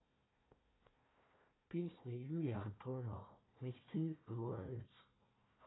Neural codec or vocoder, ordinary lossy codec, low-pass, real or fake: codec, 16 kHz, 1 kbps, FunCodec, trained on Chinese and English, 50 frames a second; AAC, 16 kbps; 7.2 kHz; fake